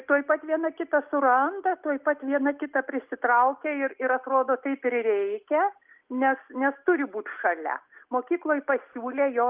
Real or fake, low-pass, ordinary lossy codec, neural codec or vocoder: real; 3.6 kHz; Opus, 32 kbps; none